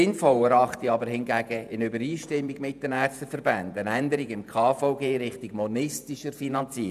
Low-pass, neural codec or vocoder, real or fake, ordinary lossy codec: 14.4 kHz; vocoder, 48 kHz, 128 mel bands, Vocos; fake; none